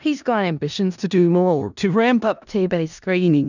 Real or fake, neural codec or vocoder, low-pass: fake; codec, 16 kHz in and 24 kHz out, 0.4 kbps, LongCat-Audio-Codec, four codebook decoder; 7.2 kHz